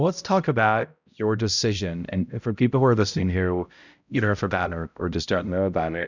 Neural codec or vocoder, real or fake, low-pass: codec, 16 kHz, 0.5 kbps, X-Codec, HuBERT features, trained on balanced general audio; fake; 7.2 kHz